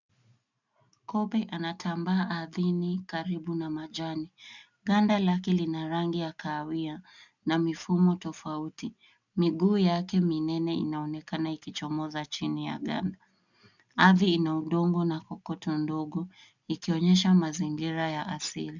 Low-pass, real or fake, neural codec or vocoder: 7.2 kHz; real; none